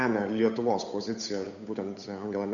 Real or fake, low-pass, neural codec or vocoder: fake; 7.2 kHz; codec, 16 kHz, 8 kbps, FunCodec, trained on Chinese and English, 25 frames a second